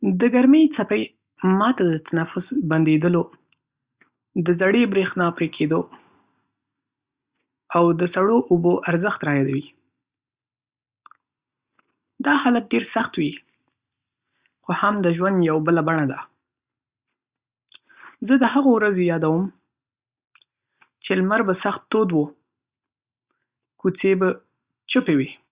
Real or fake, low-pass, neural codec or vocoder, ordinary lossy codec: real; 3.6 kHz; none; Opus, 64 kbps